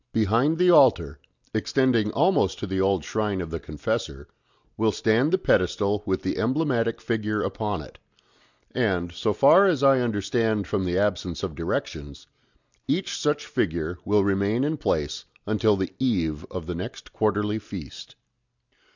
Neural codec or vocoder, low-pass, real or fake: none; 7.2 kHz; real